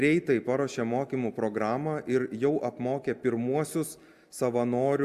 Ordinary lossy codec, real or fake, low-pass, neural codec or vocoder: Opus, 64 kbps; real; 14.4 kHz; none